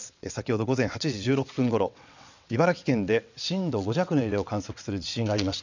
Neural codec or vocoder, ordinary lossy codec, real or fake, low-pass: vocoder, 22.05 kHz, 80 mel bands, WaveNeXt; none; fake; 7.2 kHz